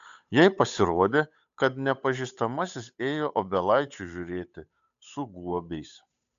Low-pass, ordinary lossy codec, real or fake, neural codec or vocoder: 7.2 kHz; AAC, 64 kbps; fake; codec, 16 kHz, 8 kbps, FunCodec, trained on Chinese and English, 25 frames a second